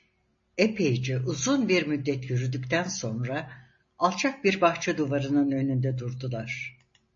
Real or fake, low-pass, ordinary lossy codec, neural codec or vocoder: real; 7.2 kHz; MP3, 32 kbps; none